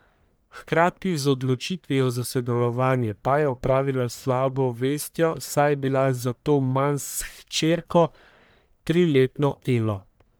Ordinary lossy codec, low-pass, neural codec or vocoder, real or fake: none; none; codec, 44.1 kHz, 1.7 kbps, Pupu-Codec; fake